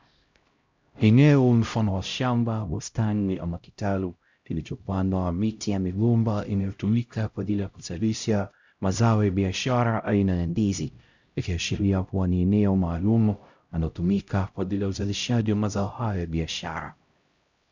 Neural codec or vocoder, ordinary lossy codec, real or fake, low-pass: codec, 16 kHz, 0.5 kbps, X-Codec, HuBERT features, trained on LibriSpeech; Opus, 64 kbps; fake; 7.2 kHz